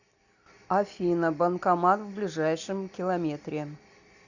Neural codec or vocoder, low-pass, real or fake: none; 7.2 kHz; real